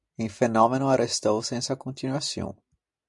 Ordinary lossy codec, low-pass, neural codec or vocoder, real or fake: MP3, 64 kbps; 10.8 kHz; none; real